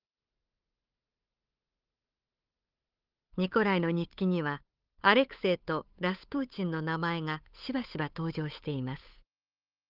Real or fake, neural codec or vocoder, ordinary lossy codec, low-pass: fake; codec, 16 kHz, 8 kbps, FunCodec, trained on Chinese and English, 25 frames a second; Opus, 24 kbps; 5.4 kHz